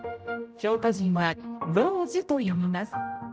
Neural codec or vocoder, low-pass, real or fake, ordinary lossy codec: codec, 16 kHz, 0.5 kbps, X-Codec, HuBERT features, trained on general audio; none; fake; none